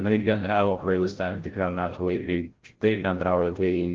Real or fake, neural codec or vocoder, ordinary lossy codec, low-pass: fake; codec, 16 kHz, 0.5 kbps, FreqCodec, larger model; Opus, 16 kbps; 7.2 kHz